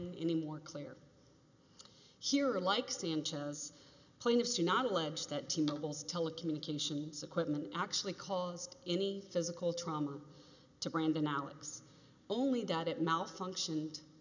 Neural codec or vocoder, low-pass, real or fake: none; 7.2 kHz; real